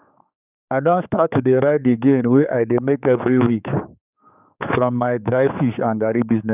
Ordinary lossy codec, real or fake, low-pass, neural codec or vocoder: none; fake; 3.6 kHz; codec, 16 kHz, 4 kbps, X-Codec, HuBERT features, trained on general audio